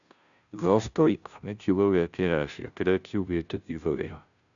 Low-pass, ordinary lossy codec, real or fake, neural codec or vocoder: 7.2 kHz; none; fake; codec, 16 kHz, 0.5 kbps, FunCodec, trained on Chinese and English, 25 frames a second